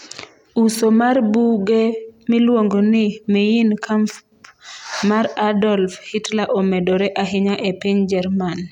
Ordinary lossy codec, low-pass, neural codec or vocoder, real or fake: none; 19.8 kHz; none; real